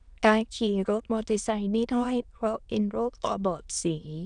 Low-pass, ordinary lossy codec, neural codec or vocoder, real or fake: 9.9 kHz; none; autoencoder, 22.05 kHz, a latent of 192 numbers a frame, VITS, trained on many speakers; fake